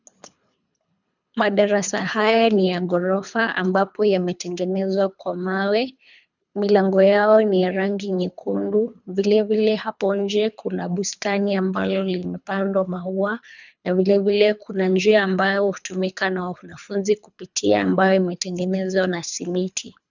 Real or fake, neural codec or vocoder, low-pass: fake; codec, 24 kHz, 3 kbps, HILCodec; 7.2 kHz